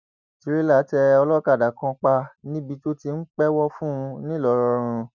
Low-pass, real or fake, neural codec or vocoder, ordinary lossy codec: 7.2 kHz; real; none; none